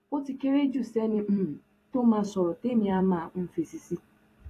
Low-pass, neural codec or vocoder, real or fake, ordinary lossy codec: 14.4 kHz; vocoder, 44.1 kHz, 128 mel bands every 256 samples, BigVGAN v2; fake; AAC, 48 kbps